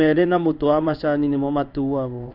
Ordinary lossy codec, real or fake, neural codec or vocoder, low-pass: none; fake; codec, 16 kHz in and 24 kHz out, 1 kbps, XY-Tokenizer; 5.4 kHz